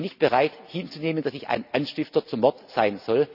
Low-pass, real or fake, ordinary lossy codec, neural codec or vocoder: 5.4 kHz; real; none; none